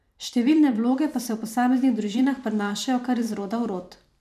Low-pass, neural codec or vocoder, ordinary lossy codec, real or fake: 14.4 kHz; vocoder, 44.1 kHz, 128 mel bands every 512 samples, BigVGAN v2; none; fake